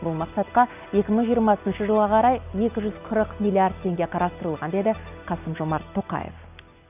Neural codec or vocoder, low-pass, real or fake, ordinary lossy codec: none; 3.6 kHz; real; none